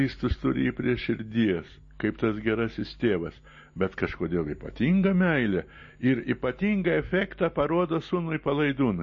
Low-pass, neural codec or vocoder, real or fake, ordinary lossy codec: 10.8 kHz; none; real; MP3, 32 kbps